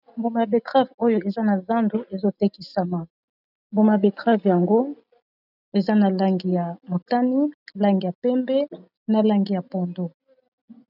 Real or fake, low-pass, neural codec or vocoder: real; 5.4 kHz; none